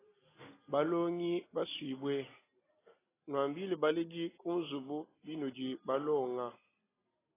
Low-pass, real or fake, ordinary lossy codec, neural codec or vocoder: 3.6 kHz; real; AAC, 16 kbps; none